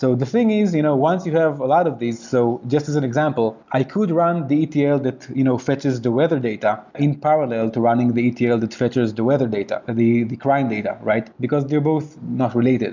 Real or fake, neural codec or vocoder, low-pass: real; none; 7.2 kHz